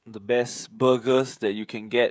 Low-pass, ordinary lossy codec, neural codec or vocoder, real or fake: none; none; codec, 16 kHz, 16 kbps, FreqCodec, smaller model; fake